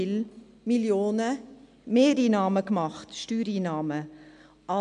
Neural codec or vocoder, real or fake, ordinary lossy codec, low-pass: none; real; MP3, 64 kbps; 9.9 kHz